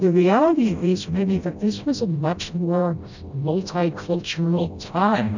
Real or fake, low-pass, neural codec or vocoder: fake; 7.2 kHz; codec, 16 kHz, 0.5 kbps, FreqCodec, smaller model